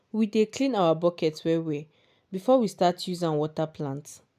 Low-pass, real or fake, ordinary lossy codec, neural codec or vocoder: 14.4 kHz; real; none; none